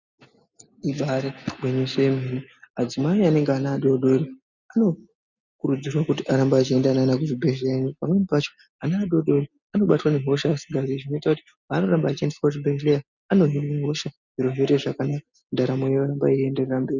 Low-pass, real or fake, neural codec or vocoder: 7.2 kHz; real; none